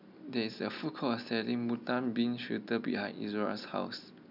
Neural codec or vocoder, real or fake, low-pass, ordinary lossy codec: none; real; 5.4 kHz; none